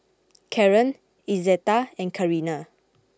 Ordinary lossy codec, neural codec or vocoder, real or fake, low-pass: none; none; real; none